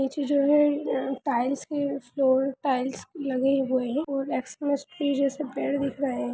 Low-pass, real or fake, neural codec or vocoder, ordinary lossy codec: none; real; none; none